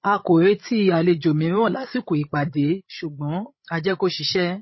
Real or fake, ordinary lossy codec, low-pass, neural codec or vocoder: fake; MP3, 24 kbps; 7.2 kHz; codec, 16 kHz, 16 kbps, FreqCodec, larger model